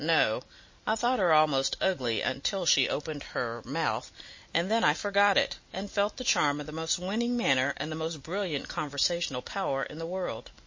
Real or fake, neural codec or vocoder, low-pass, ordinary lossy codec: real; none; 7.2 kHz; MP3, 32 kbps